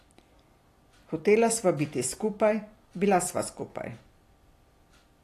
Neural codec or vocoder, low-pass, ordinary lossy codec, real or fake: vocoder, 44.1 kHz, 128 mel bands every 256 samples, BigVGAN v2; 14.4 kHz; AAC, 48 kbps; fake